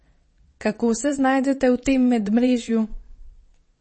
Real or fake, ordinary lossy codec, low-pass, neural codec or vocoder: fake; MP3, 32 kbps; 9.9 kHz; vocoder, 22.05 kHz, 80 mel bands, WaveNeXt